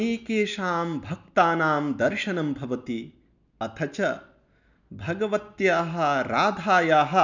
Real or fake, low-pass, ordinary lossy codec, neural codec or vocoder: real; 7.2 kHz; none; none